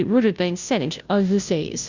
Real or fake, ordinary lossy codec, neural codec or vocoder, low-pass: fake; Opus, 64 kbps; codec, 16 kHz, 0.5 kbps, FunCodec, trained on Chinese and English, 25 frames a second; 7.2 kHz